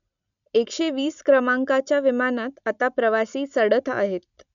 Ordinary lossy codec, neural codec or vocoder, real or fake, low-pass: MP3, 96 kbps; none; real; 7.2 kHz